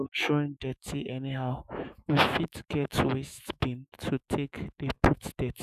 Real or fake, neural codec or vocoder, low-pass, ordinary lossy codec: fake; autoencoder, 48 kHz, 128 numbers a frame, DAC-VAE, trained on Japanese speech; 14.4 kHz; none